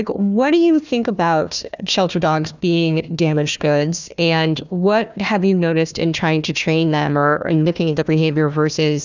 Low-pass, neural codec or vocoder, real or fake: 7.2 kHz; codec, 16 kHz, 1 kbps, FunCodec, trained on Chinese and English, 50 frames a second; fake